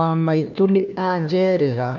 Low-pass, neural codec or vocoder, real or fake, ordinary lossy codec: 7.2 kHz; codec, 16 kHz, 1 kbps, FunCodec, trained on LibriTTS, 50 frames a second; fake; none